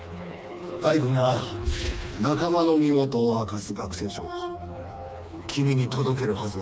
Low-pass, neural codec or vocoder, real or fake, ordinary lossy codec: none; codec, 16 kHz, 2 kbps, FreqCodec, smaller model; fake; none